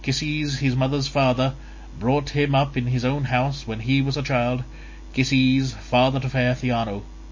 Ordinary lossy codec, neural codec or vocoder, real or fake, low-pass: MP3, 32 kbps; none; real; 7.2 kHz